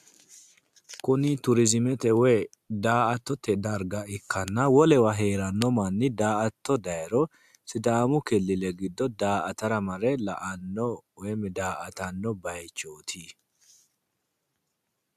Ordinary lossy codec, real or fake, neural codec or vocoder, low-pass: AAC, 96 kbps; real; none; 14.4 kHz